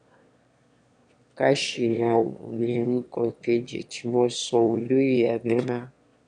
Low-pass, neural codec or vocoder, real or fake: 9.9 kHz; autoencoder, 22.05 kHz, a latent of 192 numbers a frame, VITS, trained on one speaker; fake